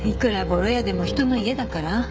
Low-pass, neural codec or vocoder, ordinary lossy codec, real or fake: none; codec, 16 kHz, 16 kbps, FreqCodec, smaller model; none; fake